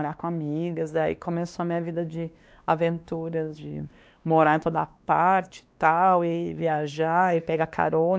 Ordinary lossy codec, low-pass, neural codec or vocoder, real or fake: none; none; codec, 16 kHz, 2 kbps, X-Codec, WavLM features, trained on Multilingual LibriSpeech; fake